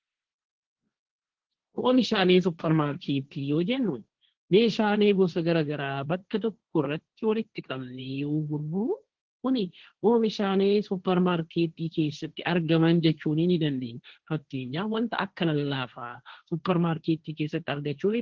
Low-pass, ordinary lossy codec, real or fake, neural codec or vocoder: 7.2 kHz; Opus, 16 kbps; fake; codec, 16 kHz, 1.1 kbps, Voila-Tokenizer